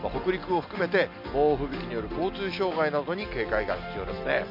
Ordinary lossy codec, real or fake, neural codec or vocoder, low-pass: AAC, 48 kbps; real; none; 5.4 kHz